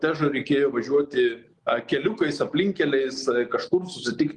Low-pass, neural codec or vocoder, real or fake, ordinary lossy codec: 10.8 kHz; none; real; Opus, 24 kbps